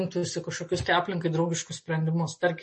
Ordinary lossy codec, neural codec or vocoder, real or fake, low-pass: MP3, 32 kbps; none; real; 10.8 kHz